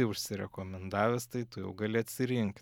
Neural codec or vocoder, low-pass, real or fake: none; 19.8 kHz; real